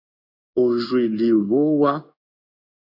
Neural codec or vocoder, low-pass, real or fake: codec, 16 kHz in and 24 kHz out, 1 kbps, XY-Tokenizer; 5.4 kHz; fake